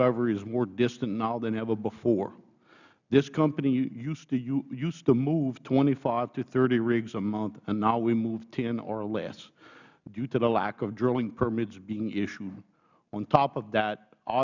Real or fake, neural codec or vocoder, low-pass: real; none; 7.2 kHz